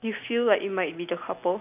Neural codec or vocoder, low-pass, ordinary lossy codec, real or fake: none; 3.6 kHz; none; real